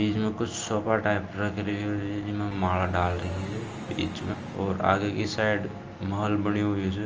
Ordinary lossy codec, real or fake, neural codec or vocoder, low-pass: Opus, 24 kbps; real; none; 7.2 kHz